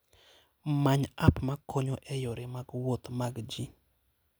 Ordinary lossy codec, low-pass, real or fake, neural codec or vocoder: none; none; real; none